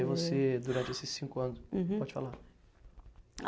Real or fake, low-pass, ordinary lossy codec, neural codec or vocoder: real; none; none; none